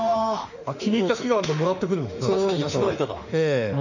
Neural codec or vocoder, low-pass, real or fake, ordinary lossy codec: autoencoder, 48 kHz, 32 numbers a frame, DAC-VAE, trained on Japanese speech; 7.2 kHz; fake; none